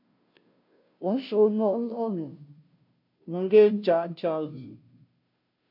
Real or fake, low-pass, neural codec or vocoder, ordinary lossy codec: fake; 5.4 kHz; codec, 16 kHz, 0.5 kbps, FunCodec, trained on Chinese and English, 25 frames a second; MP3, 48 kbps